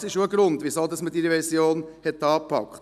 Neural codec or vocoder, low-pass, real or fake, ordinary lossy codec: none; 14.4 kHz; real; none